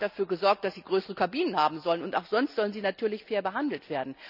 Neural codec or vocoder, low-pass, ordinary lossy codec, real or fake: none; 5.4 kHz; none; real